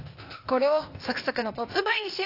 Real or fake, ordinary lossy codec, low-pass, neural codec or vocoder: fake; AAC, 32 kbps; 5.4 kHz; codec, 16 kHz, 0.8 kbps, ZipCodec